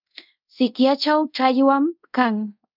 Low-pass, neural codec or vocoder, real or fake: 5.4 kHz; codec, 24 kHz, 0.5 kbps, DualCodec; fake